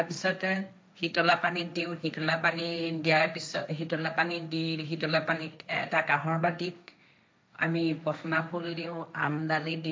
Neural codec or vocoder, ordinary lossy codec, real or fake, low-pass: codec, 16 kHz, 1.1 kbps, Voila-Tokenizer; none; fake; none